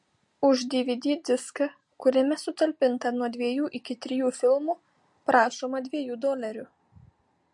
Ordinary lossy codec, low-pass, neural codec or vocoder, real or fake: MP3, 48 kbps; 10.8 kHz; none; real